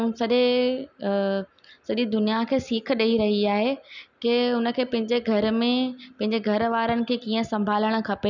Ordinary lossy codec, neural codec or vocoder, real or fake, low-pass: none; none; real; 7.2 kHz